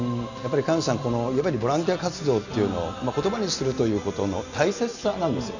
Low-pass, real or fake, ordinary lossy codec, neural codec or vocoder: 7.2 kHz; real; none; none